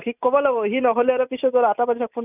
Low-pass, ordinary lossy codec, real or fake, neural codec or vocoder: 3.6 kHz; none; real; none